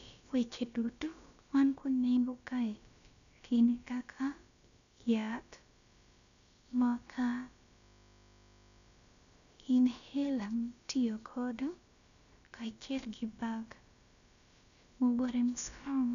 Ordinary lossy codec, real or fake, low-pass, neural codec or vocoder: none; fake; 7.2 kHz; codec, 16 kHz, about 1 kbps, DyCAST, with the encoder's durations